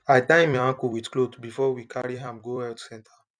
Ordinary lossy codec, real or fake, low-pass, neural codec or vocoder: none; fake; 9.9 kHz; vocoder, 44.1 kHz, 128 mel bands every 256 samples, BigVGAN v2